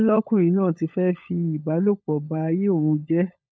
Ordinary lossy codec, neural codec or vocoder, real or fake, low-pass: none; codec, 16 kHz, 16 kbps, FunCodec, trained on LibriTTS, 50 frames a second; fake; none